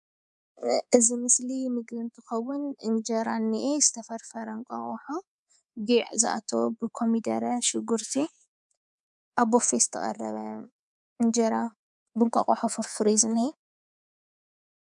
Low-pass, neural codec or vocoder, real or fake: 10.8 kHz; codec, 24 kHz, 3.1 kbps, DualCodec; fake